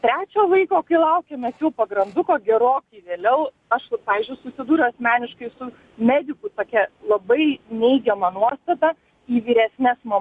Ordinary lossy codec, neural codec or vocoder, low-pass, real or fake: Opus, 64 kbps; none; 10.8 kHz; real